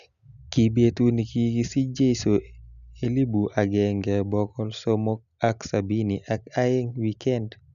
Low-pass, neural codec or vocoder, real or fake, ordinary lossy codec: 7.2 kHz; none; real; none